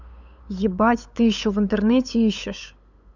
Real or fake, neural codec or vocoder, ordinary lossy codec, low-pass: fake; codec, 16 kHz, 8 kbps, FunCodec, trained on LibriTTS, 25 frames a second; none; 7.2 kHz